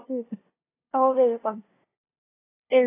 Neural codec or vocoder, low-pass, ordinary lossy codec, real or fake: codec, 16 kHz, 0.5 kbps, FunCodec, trained on LibriTTS, 25 frames a second; 3.6 kHz; AAC, 16 kbps; fake